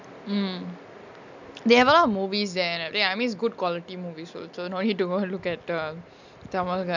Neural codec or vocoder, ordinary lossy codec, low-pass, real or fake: none; none; 7.2 kHz; real